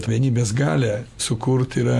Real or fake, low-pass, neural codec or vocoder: real; 14.4 kHz; none